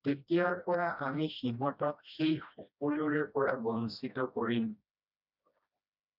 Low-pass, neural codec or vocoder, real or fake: 5.4 kHz; codec, 16 kHz, 1 kbps, FreqCodec, smaller model; fake